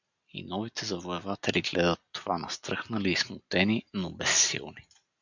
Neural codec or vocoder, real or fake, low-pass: none; real; 7.2 kHz